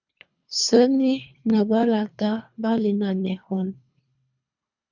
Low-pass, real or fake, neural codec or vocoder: 7.2 kHz; fake; codec, 24 kHz, 3 kbps, HILCodec